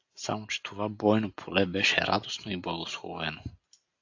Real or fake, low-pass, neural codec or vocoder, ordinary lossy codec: real; 7.2 kHz; none; AAC, 48 kbps